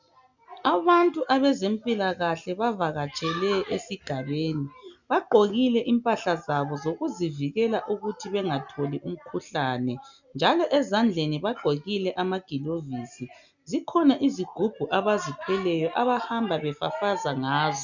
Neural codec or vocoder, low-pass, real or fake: none; 7.2 kHz; real